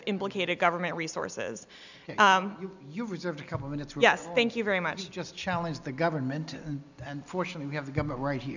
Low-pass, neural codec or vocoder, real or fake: 7.2 kHz; none; real